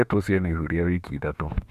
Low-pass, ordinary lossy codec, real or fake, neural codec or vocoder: 14.4 kHz; Opus, 64 kbps; fake; autoencoder, 48 kHz, 32 numbers a frame, DAC-VAE, trained on Japanese speech